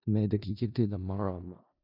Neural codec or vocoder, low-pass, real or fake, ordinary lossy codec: codec, 16 kHz in and 24 kHz out, 0.4 kbps, LongCat-Audio-Codec, four codebook decoder; 5.4 kHz; fake; none